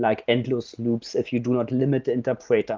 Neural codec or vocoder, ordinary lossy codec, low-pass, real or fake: none; Opus, 32 kbps; 7.2 kHz; real